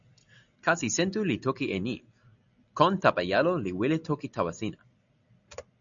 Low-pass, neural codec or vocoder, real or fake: 7.2 kHz; none; real